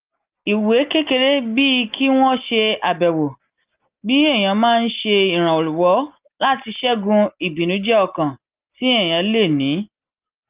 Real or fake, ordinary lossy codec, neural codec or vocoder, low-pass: real; Opus, 24 kbps; none; 3.6 kHz